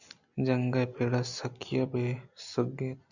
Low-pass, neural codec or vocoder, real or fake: 7.2 kHz; none; real